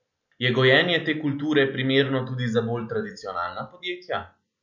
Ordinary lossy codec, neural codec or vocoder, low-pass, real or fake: none; none; 7.2 kHz; real